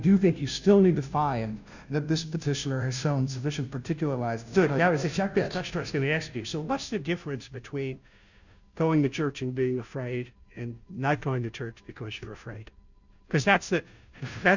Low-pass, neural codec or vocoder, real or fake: 7.2 kHz; codec, 16 kHz, 0.5 kbps, FunCodec, trained on Chinese and English, 25 frames a second; fake